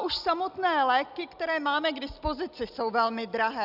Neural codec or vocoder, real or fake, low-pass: none; real; 5.4 kHz